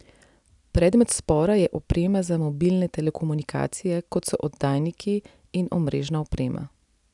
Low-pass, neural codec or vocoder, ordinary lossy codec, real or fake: 10.8 kHz; none; none; real